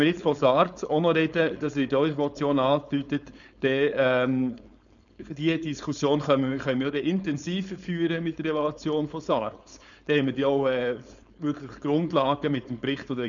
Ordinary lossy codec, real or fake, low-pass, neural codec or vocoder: none; fake; 7.2 kHz; codec, 16 kHz, 4.8 kbps, FACodec